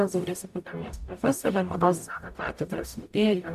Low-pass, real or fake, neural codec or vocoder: 14.4 kHz; fake; codec, 44.1 kHz, 0.9 kbps, DAC